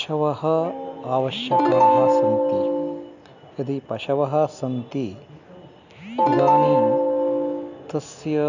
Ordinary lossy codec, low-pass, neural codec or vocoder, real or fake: none; 7.2 kHz; none; real